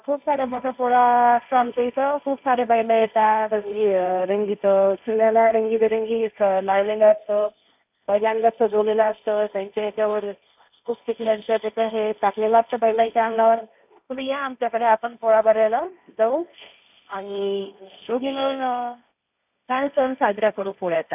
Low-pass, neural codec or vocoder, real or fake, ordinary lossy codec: 3.6 kHz; codec, 16 kHz, 1.1 kbps, Voila-Tokenizer; fake; none